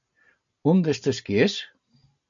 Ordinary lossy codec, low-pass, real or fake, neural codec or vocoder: AAC, 64 kbps; 7.2 kHz; real; none